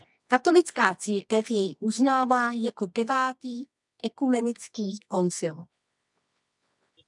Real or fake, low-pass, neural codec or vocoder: fake; 10.8 kHz; codec, 24 kHz, 0.9 kbps, WavTokenizer, medium music audio release